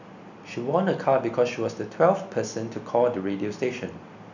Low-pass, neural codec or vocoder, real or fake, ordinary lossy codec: 7.2 kHz; none; real; none